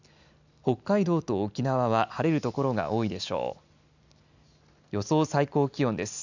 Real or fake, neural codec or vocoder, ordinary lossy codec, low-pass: real; none; none; 7.2 kHz